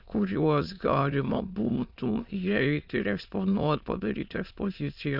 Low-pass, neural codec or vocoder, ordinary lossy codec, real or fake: 5.4 kHz; autoencoder, 22.05 kHz, a latent of 192 numbers a frame, VITS, trained on many speakers; Opus, 64 kbps; fake